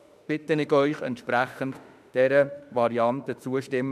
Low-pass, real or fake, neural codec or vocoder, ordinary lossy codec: 14.4 kHz; fake; autoencoder, 48 kHz, 32 numbers a frame, DAC-VAE, trained on Japanese speech; none